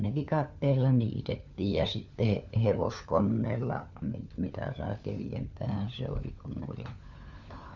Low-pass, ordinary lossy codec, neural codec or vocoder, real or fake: 7.2 kHz; Opus, 64 kbps; codec, 16 kHz, 4 kbps, FunCodec, trained on LibriTTS, 50 frames a second; fake